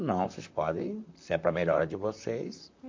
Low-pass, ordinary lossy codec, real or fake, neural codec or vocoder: 7.2 kHz; MP3, 48 kbps; fake; vocoder, 44.1 kHz, 128 mel bands, Pupu-Vocoder